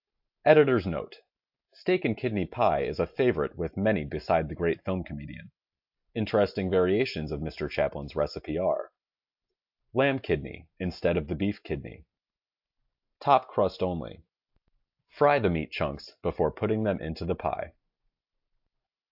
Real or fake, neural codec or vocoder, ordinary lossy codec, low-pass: real; none; AAC, 48 kbps; 5.4 kHz